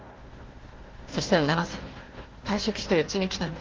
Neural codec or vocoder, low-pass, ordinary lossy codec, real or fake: codec, 16 kHz, 1 kbps, FunCodec, trained on Chinese and English, 50 frames a second; 7.2 kHz; Opus, 16 kbps; fake